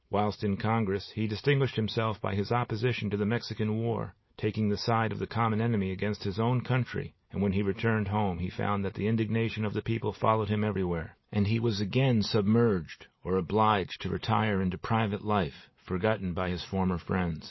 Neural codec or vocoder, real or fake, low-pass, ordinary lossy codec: none; real; 7.2 kHz; MP3, 24 kbps